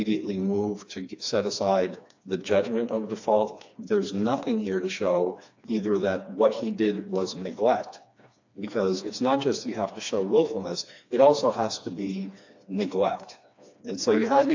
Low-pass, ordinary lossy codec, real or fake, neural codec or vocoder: 7.2 kHz; AAC, 48 kbps; fake; codec, 16 kHz, 2 kbps, FreqCodec, smaller model